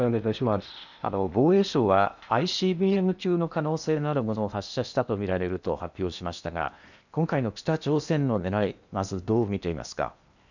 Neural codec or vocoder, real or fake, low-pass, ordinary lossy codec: codec, 16 kHz in and 24 kHz out, 0.8 kbps, FocalCodec, streaming, 65536 codes; fake; 7.2 kHz; none